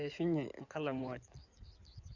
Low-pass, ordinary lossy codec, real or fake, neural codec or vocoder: 7.2 kHz; none; fake; codec, 16 kHz in and 24 kHz out, 2.2 kbps, FireRedTTS-2 codec